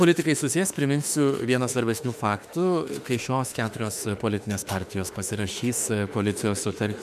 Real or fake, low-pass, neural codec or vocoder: fake; 14.4 kHz; autoencoder, 48 kHz, 32 numbers a frame, DAC-VAE, trained on Japanese speech